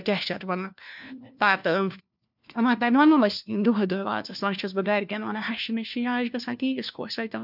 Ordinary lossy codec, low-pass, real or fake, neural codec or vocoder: none; 5.4 kHz; fake; codec, 16 kHz, 1 kbps, FunCodec, trained on LibriTTS, 50 frames a second